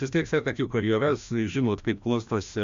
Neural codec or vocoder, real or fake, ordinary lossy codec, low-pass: codec, 16 kHz, 1 kbps, FreqCodec, larger model; fake; MP3, 64 kbps; 7.2 kHz